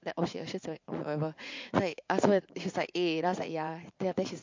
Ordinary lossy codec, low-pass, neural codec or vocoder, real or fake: MP3, 48 kbps; 7.2 kHz; vocoder, 44.1 kHz, 80 mel bands, Vocos; fake